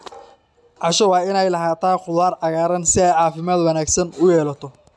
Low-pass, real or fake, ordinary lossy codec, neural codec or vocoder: none; real; none; none